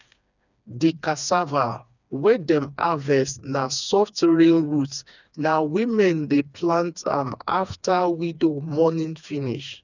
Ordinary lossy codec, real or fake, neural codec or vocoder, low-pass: none; fake; codec, 16 kHz, 2 kbps, FreqCodec, smaller model; 7.2 kHz